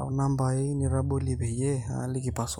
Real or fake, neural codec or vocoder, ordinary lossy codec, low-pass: real; none; none; 19.8 kHz